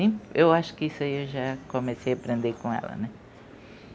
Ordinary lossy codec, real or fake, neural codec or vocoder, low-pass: none; real; none; none